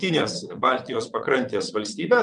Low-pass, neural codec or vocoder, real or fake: 9.9 kHz; vocoder, 22.05 kHz, 80 mel bands, WaveNeXt; fake